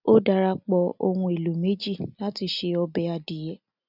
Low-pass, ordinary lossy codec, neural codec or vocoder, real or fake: 5.4 kHz; none; none; real